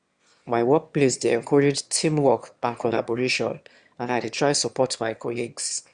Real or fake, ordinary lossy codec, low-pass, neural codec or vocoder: fake; Opus, 64 kbps; 9.9 kHz; autoencoder, 22.05 kHz, a latent of 192 numbers a frame, VITS, trained on one speaker